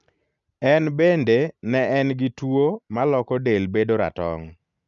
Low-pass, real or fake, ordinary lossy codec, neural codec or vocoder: 7.2 kHz; real; none; none